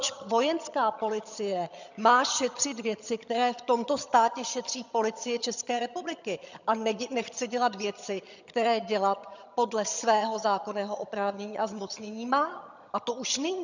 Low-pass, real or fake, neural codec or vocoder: 7.2 kHz; fake; vocoder, 22.05 kHz, 80 mel bands, HiFi-GAN